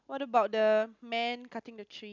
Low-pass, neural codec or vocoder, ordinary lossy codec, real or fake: 7.2 kHz; none; none; real